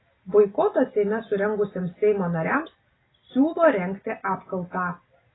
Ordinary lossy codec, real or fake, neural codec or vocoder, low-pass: AAC, 16 kbps; real; none; 7.2 kHz